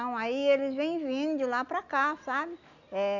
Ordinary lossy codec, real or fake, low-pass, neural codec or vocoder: none; real; 7.2 kHz; none